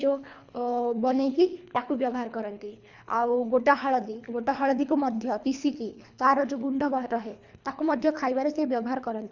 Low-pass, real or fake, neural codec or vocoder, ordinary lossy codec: 7.2 kHz; fake; codec, 24 kHz, 3 kbps, HILCodec; Opus, 64 kbps